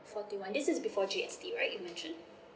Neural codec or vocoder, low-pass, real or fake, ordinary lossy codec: none; none; real; none